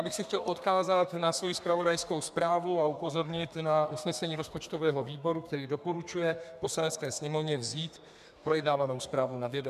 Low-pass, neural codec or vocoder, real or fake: 14.4 kHz; codec, 32 kHz, 1.9 kbps, SNAC; fake